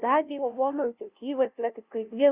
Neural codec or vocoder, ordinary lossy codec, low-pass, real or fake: codec, 16 kHz, 0.5 kbps, FunCodec, trained on LibriTTS, 25 frames a second; Opus, 64 kbps; 3.6 kHz; fake